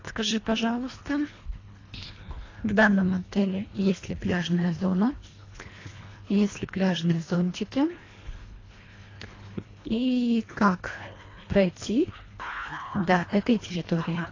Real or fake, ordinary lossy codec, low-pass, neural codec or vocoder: fake; AAC, 32 kbps; 7.2 kHz; codec, 24 kHz, 1.5 kbps, HILCodec